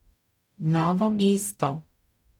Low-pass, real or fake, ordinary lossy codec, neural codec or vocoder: 19.8 kHz; fake; none; codec, 44.1 kHz, 0.9 kbps, DAC